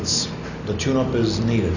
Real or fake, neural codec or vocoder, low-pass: real; none; 7.2 kHz